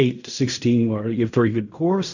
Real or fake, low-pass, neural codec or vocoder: fake; 7.2 kHz; codec, 16 kHz in and 24 kHz out, 0.4 kbps, LongCat-Audio-Codec, fine tuned four codebook decoder